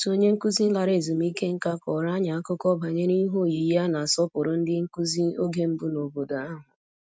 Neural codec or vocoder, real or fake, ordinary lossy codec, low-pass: none; real; none; none